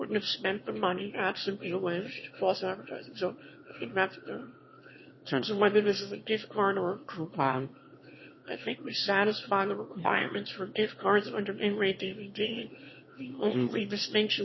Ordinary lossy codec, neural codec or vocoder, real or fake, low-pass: MP3, 24 kbps; autoencoder, 22.05 kHz, a latent of 192 numbers a frame, VITS, trained on one speaker; fake; 7.2 kHz